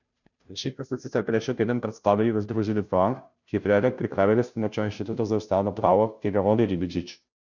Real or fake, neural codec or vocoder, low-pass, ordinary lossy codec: fake; codec, 16 kHz, 0.5 kbps, FunCodec, trained on Chinese and English, 25 frames a second; 7.2 kHz; none